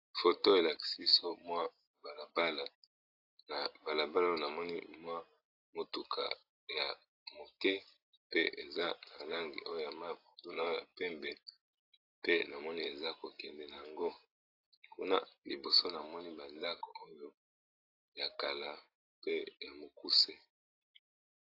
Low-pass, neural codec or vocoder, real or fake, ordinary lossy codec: 5.4 kHz; none; real; AAC, 32 kbps